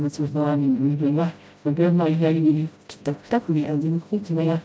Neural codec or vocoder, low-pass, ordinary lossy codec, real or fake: codec, 16 kHz, 0.5 kbps, FreqCodec, smaller model; none; none; fake